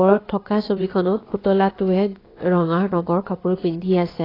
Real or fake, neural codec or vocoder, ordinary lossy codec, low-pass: fake; codec, 16 kHz, about 1 kbps, DyCAST, with the encoder's durations; AAC, 24 kbps; 5.4 kHz